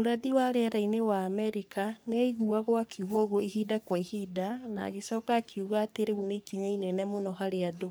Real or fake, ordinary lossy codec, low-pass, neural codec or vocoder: fake; none; none; codec, 44.1 kHz, 3.4 kbps, Pupu-Codec